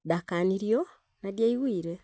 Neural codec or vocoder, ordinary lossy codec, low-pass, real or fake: none; none; none; real